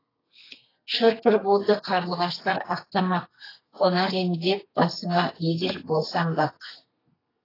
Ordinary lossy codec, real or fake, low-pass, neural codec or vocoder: AAC, 24 kbps; fake; 5.4 kHz; codec, 32 kHz, 1.9 kbps, SNAC